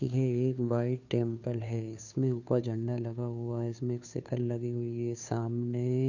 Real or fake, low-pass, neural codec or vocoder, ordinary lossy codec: fake; 7.2 kHz; codec, 16 kHz, 2 kbps, FunCodec, trained on Chinese and English, 25 frames a second; none